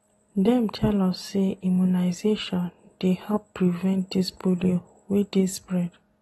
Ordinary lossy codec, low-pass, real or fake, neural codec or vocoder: AAC, 32 kbps; 19.8 kHz; real; none